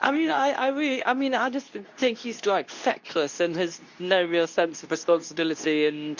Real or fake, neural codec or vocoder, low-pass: fake; codec, 24 kHz, 0.9 kbps, WavTokenizer, medium speech release version 2; 7.2 kHz